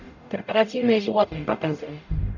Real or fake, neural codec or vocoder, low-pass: fake; codec, 44.1 kHz, 0.9 kbps, DAC; 7.2 kHz